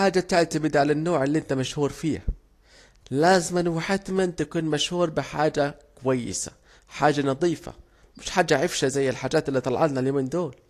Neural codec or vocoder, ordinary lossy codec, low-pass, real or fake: none; AAC, 48 kbps; 14.4 kHz; real